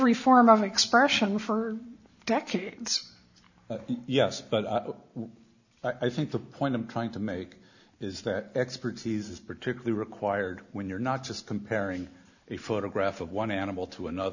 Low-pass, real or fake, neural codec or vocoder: 7.2 kHz; real; none